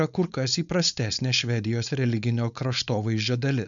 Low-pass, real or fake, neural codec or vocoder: 7.2 kHz; fake; codec, 16 kHz, 4.8 kbps, FACodec